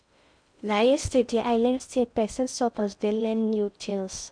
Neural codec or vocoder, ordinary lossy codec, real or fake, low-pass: codec, 16 kHz in and 24 kHz out, 0.6 kbps, FocalCodec, streaming, 4096 codes; none; fake; 9.9 kHz